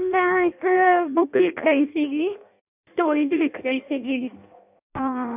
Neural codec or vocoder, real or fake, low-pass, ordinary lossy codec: codec, 16 kHz in and 24 kHz out, 0.6 kbps, FireRedTTS-2 codec; fake; 3.6 kHz; none